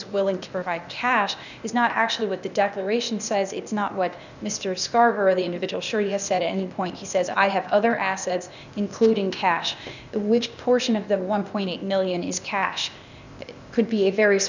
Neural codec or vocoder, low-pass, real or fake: codec, 16 kHz, 0.8 kbps, ZipCodec; 7.2 kHz; fake